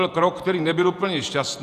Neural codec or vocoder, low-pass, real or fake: vocoder, 48 kHz, 128 mel bands, Vocos; 14.4 kHz; fake